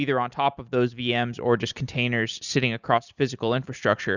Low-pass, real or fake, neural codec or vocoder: 7.2 kHz; real; none